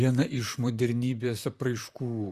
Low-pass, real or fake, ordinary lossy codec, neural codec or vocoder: 14.4 kHz; fake; Opus, 64 kbps; vocoder, 44.1 kHz, 128 mel bands, Pupu-Vocoder